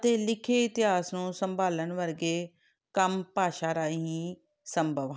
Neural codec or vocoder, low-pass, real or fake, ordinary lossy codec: none; none; real; none